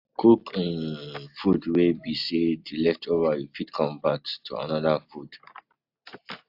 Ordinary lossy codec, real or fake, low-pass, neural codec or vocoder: AAC, 48 kbps; real; 5.4 kHz; none